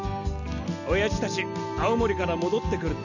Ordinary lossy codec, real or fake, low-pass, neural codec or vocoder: none; real; 7.2 kHz; none